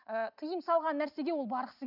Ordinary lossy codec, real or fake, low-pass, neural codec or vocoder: Opus, 64 kbps; real; 5.4 kHz; none